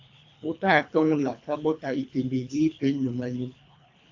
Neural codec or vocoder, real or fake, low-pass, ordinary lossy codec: codec, 24 kHz, 3 kbps, HILCodec; fake; 7.2 kHz; AAC, 48 kbps